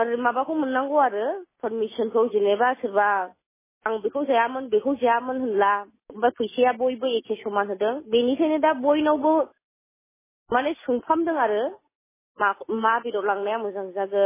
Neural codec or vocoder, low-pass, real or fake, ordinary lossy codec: none; 3.6 kHz; real; MP3, 16 kbps